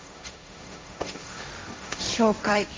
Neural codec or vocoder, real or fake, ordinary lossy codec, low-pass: codec, 16 kHz, 1.1 kbps, Voila-Tokenizer; fake; none; none